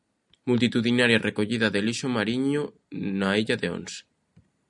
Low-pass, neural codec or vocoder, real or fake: 10.8 kHz; none; real